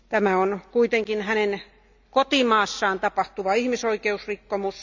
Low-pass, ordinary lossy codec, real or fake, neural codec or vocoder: 7.2 kHz; none; real; none